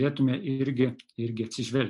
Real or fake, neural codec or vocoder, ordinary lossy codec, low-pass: real; none; AAC, 64 kbps; 10.8 kHz